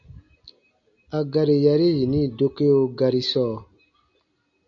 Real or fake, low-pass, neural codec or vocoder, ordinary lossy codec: real; 7.2 kHz; none; MP3, 96 kbps